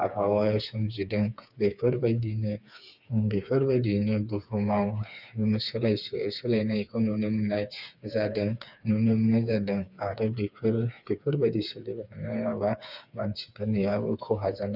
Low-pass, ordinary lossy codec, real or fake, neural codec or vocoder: 5.4 kHz; none; fake; codec, 16 kHz, 4 kbps, FreqCodec, smaller model